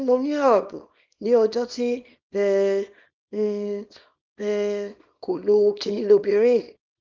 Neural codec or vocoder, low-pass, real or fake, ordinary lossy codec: codec, 24 kHz, 0.9 kbps, WavTokenizer, small release; 7.2 kHz; fake; Opus, 24 kbps